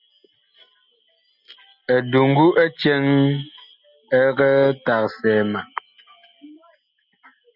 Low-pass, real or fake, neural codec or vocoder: 5.4 kHz; real; none